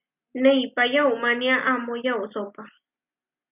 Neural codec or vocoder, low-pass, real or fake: none; 3.6 kHz; real